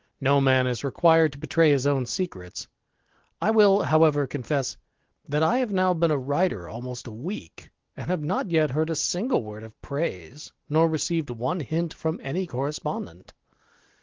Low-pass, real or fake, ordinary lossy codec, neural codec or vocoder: 7.2 kHz; real; Opus, 16 kbps; none